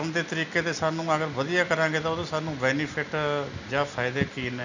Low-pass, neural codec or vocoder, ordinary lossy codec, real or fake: 7.2 kHz; none; none; real